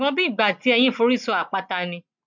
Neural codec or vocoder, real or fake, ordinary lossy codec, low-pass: none; real; none; 7.2 kHz